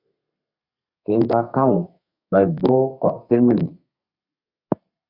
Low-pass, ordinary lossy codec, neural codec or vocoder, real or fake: 5.4 kHz; Opus, 64 kbps; codec, 32 kHz, 1.9 kbps, SNAC; fake